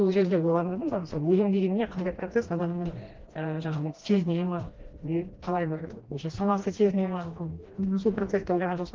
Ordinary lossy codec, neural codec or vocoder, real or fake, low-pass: Opus, 16 kbps; codec, 16 kHz, 1 kbps, FreqCodec, smaller model; fake; 7.2 kHz